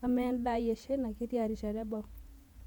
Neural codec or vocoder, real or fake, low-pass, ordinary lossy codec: vocoder, 48 kHz, 128 mel bands, Vocos; fake; 19.8 kHz; MP3, 96 kbps